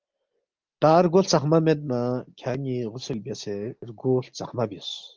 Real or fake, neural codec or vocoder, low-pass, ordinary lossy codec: real; none; 7.2 kHz; Opus, 32 kbps